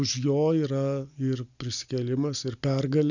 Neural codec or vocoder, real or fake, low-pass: none; real; 7.2 kHz